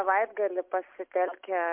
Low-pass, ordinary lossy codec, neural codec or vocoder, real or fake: 3.6 kHz; MP3, 32 kbps; none; real